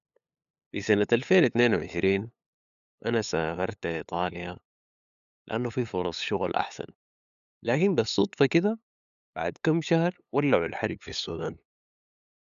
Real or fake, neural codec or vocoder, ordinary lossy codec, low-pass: fake; codec, 16 kHz, 8 kbps, FunCodec, trained on LibriTTS, 25 frames a second; MP3, 96 kbps; 7.2 kHz